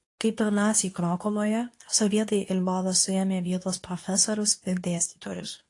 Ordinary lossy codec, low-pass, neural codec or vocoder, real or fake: AAC, 48 kbps; 10.8 kHz; codec, 24 kHz, 0.9 kbps, WavTokenizer, medium speech release version 2; fake